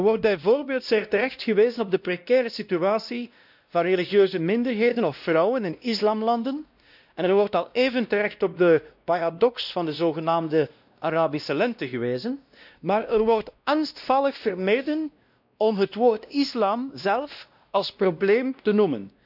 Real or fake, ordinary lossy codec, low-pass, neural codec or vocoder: fake; none; 5.4 kHz; codec, 16 kHz, 1 kbps, X-Codec, WavLM features, trained on Multilingual LibriSpeech